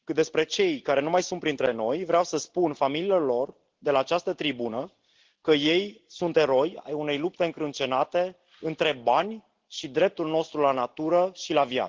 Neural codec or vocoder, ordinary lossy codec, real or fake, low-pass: none; Opus, 16 kbps; real; 7.2 kHz